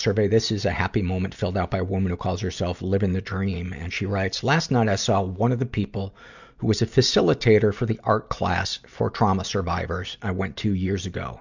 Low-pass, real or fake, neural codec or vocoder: 7.2 kHz; real; none